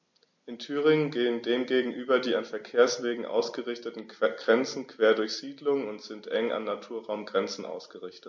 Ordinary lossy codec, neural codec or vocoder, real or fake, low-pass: MP3, 48 kbps; none; real; 7.2 kHz